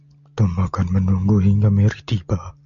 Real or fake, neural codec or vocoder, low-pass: real; none; 7.2 kHz